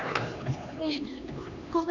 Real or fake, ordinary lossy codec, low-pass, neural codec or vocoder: fake; none; 7.2 kHz; codec, 16 kHz, 2 kbps, X-Codec, HuBERT features, trained on LibriSpeech